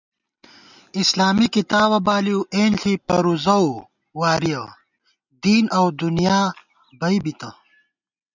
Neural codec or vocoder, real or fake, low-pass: none; real; 7.2 kHz